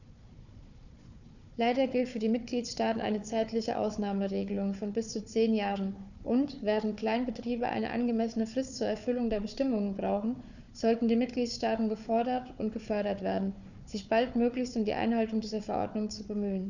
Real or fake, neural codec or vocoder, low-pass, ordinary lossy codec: fake; codec, 16 kHz, 4 kbps, FunCodec, trained on Chinese and English, 50 frames a second; 7.2 kHz; none